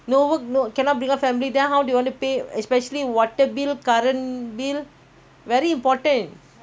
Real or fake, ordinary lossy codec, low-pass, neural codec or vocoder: real; none; none; none